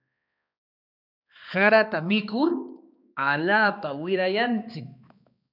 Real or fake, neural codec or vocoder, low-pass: fake; codec, 16 kHz, 2 kbps, X-Codec, HuBERT features, trained on balanced general audio; 5.4 kHz